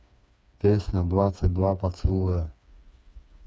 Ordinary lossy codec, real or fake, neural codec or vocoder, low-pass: none; fake; codec, 16 kHz, 4 kbps, FreqCodec, smaller model; none